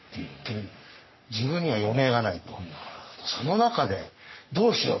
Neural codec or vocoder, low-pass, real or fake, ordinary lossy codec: codec, 44.1 kHz, 3.4 kbps, Pupu-Codec; 7.2 kHz; fake; MP3, 24 kbps